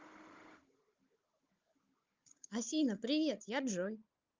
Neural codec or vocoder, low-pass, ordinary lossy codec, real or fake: codec, 16 kHz, 16 kbps, FunCodec, trained on Chinese and English, 50 frames a second; 7.2 kHz; Opus, 24 kbps; fake